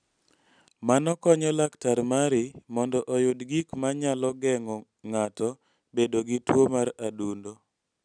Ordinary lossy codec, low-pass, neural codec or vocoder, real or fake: none; 9.9 kHz; none; real